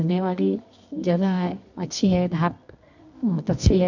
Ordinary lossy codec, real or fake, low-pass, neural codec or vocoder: none; fake; 7.2 kHz; codec, 24 kHz, 0.9 kbps, WavTokenizer, medium music audio release